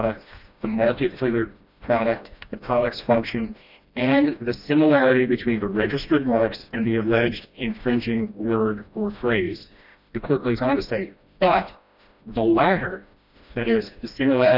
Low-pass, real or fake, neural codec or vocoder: 5.4 kHz; fake; codec, 16 kHz, 1 kbps, FreqCodec, smaller model